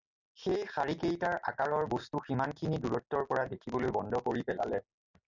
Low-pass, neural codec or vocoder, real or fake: 7.2 kHz; none; real